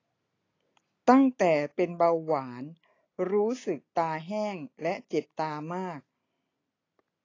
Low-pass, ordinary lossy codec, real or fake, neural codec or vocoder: 7.2 kHz; AAC, 32 kbps; real; none